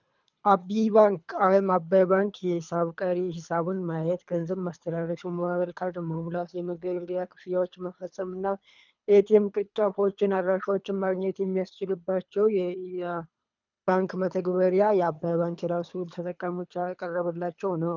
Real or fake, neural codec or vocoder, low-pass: fake; codec, 24 kHz, 3 kbps, HILCodec; 7.2 kHz